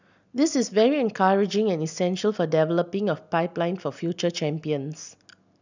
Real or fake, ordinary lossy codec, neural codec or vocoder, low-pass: real; none; none; 7.2 kHz